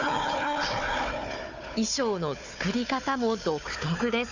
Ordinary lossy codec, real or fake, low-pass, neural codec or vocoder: none; fake; 7.2 kHz; codec, 16 kHz, 4 kbps, FunCodec, trained on Chinese and English, 50 frames a second